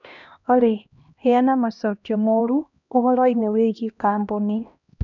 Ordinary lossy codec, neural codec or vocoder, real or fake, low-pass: none; codec, 16 kHz, 1 kbps, X-Codec, HuBERT features, trained on LibriSpeech; fake; 7.2 kHz